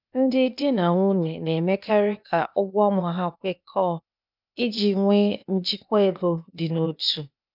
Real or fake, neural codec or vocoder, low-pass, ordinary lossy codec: fake; codec, 16 kHz, 0.8 kbps, ZipCodec; 5.4 kHz; none